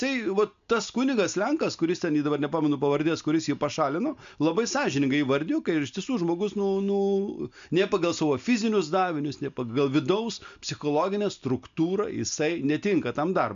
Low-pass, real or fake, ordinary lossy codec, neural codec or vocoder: 7.2 kHz; real; MP3, 64 kbps; none